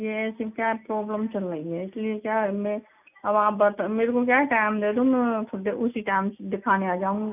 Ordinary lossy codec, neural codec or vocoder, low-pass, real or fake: none; none; 3.6 kHz; real